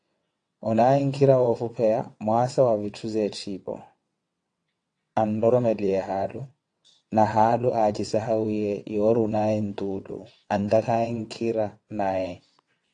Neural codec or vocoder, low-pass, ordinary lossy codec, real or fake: vocoder, 22.05 kHz, 80 mel bands, WaveNeXt; 9.9 kHz; AAC, 48 kbps; fake